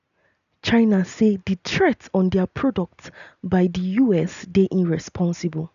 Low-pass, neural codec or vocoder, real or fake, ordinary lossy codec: 7.2 kHz; none; real; none